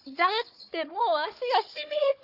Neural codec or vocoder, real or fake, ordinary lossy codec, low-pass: codec, 16 kHz, 4 kbps, FunCodec, trained on Chinese and English, 50 frames a second; fake; AAC, 48 kbps; 5.4 kHz